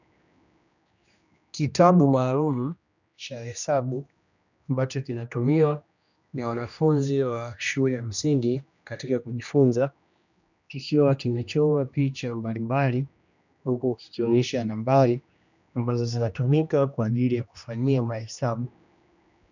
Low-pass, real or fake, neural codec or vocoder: 7.2 kHz; fake; codec, 16 kHz, 1 kbps, X-Codec, HuBERT features, trained on general audio